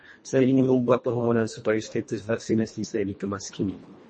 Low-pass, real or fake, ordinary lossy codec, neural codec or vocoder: 10.8 kHz; fake; MP3, 32 kbps; codec, 24 kHz, 1.5 kbps, HILCodec